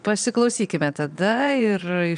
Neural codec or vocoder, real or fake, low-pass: vocoder, 22.05 kHz, 80 mel bands, Vocos; fake; 9.9 kHz